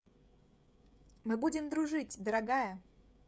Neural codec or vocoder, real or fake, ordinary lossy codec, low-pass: codec, 16 kHz, 16 kbps, FreqCodec, smaller model; fake; none; none